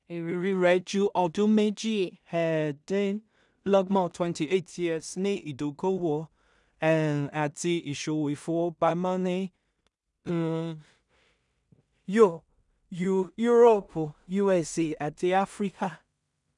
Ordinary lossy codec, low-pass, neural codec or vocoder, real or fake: none; 10.8 kHz; codec, 16 kHz in and 24 kHz out, 0.4 kbps, LongCat-Audio-Codec, two codebook decoder; fake